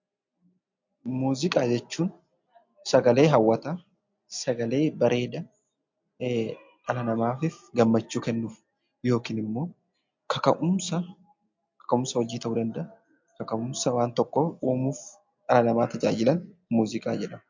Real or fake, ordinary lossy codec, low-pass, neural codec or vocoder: real; MP3, 48 kbps; 7.2 kHz; none